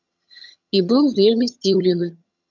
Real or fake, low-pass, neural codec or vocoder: fake; 7.2 kHz; vocoder, 22.05 kHz, 80 mel bands, HiFi-GAN